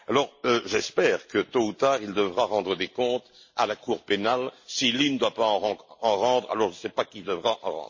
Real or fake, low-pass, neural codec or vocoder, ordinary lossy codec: real; 7.2 kHz; none; MP3, 32 kbps